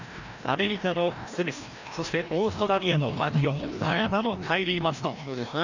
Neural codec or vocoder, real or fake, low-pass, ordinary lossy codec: codec, 16 kHz, 1 kbps, FreqCodec, larger model; fake; 7.2 kHz; none